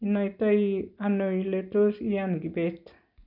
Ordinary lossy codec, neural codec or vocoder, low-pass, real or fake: none; none; 5.4 kHz; real